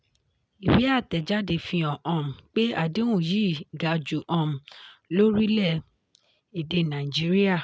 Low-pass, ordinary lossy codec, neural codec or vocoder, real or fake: none; none; none; real